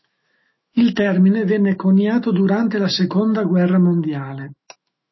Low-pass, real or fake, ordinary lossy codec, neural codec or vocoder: 7.2 kHz; fake; MP3, 24 kbps; autoencoder, 48 kHz, 128 numbers a frame, DAC-VAE, trained on Japanese speech